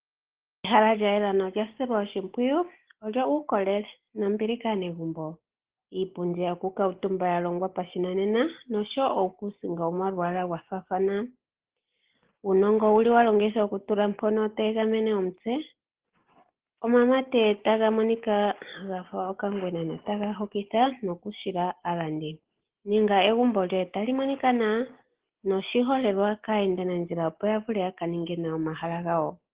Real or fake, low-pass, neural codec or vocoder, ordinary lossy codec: real; 3.6 kHz; none; Opus, 16 kbps